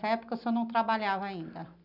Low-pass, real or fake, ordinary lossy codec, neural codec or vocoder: 5.4 kHz; real; none; none